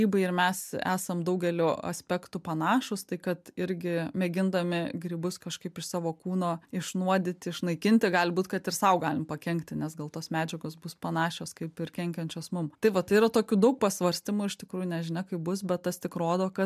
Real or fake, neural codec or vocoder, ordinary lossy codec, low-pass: real; none; MP3, 96 kbps; 14.4 kHz